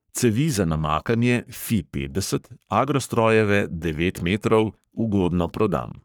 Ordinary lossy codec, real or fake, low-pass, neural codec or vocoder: none; fake; none; codec, 44.1 kHz, 3.4 kbps, Pupu-Codec